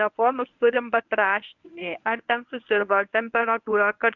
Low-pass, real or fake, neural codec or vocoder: 7.2 kHz; fake; codec, 24 kHz, 0.9 kbps, WavTokenizer, medium speech release version 1